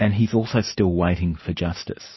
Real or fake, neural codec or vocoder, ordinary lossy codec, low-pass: real; none; MP3, 24 kbps; 7.2 kHz